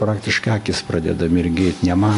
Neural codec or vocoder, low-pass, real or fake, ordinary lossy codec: none; 10.8 kHz; real; AAC, 48 kbps